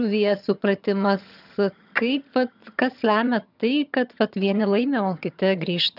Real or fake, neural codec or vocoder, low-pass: fake; vocoder, 22.05 kHz, 80 mel bands, HiFi-GAN; 5.4 kHz